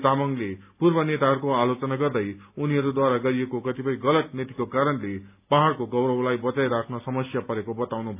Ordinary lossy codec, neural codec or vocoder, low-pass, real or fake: AAC, 32 kbps; none; 3.6 kHz; real